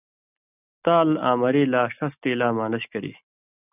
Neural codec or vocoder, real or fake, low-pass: none; real; 3.6 kHz